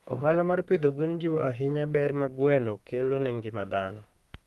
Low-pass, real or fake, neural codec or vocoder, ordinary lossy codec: 14.4 kHz; fake; codec, 32 kHz, 1.9 kbps, SNAC; Opus, 32 kbps